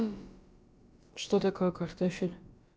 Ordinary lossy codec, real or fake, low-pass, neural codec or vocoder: none; fake; none; codec, 16 kHz, about 1 kbps, DyCAST, with the encoder's durations